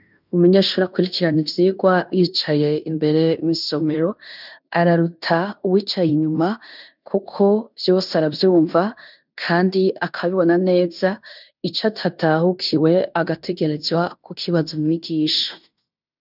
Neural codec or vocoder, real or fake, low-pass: codec, 16 kHz in and 24 kHz out, 0.9 kbps, LongCat-Audio-Codec, fine tuned four codebook decoder; fake; 5.4 kHz